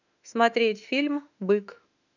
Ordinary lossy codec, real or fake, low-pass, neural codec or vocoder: none; fake; 7.2 kHz; autoencoder, 48 kHz, 32 numbers a frame, DAC-VAE, trained on Japanese speech